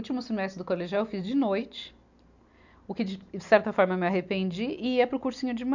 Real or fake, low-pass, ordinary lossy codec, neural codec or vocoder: real; 7.2 kHz; none; none